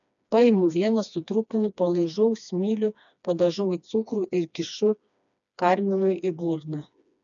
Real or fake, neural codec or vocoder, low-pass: fake; codec, 16 kHz, 2 kbps, FreqCodec, smaller model; 7.2 kHz